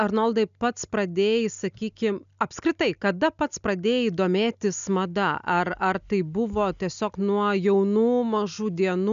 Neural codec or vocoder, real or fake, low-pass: none; real; 7.2 kHz